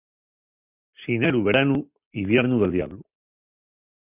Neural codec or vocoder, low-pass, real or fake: none; 3.6 kHz; real